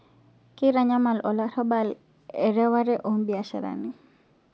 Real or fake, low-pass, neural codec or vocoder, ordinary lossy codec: real; none; none; none